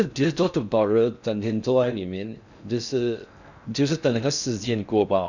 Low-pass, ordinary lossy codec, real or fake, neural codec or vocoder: 7.2 kHz; none; fake; codec, 16 kHz in and 24 kHz out, 0.6 kbps, FocalCodec, streaming, 4096 codes